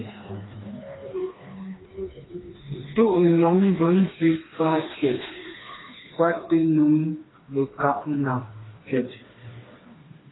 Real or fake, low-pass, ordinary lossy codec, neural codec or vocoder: fake; 7.2 kHz; AAC, 16 kbps; codec, 16 kHz, 2 kbps, FreqCodec, smaller model